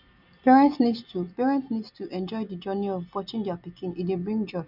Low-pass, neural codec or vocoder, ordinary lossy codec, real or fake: 5.4 kHz; none; none; real